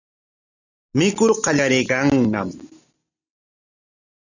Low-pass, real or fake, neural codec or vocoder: 7.2 kHz; real; none